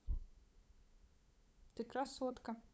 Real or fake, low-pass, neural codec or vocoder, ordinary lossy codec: fake; none; codec, 16 kHz, 8 kbps, FunCodec, trained on LibriTTS, 25 frames a second; none